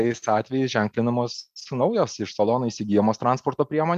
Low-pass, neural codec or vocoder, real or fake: 14.4 kHz; none; real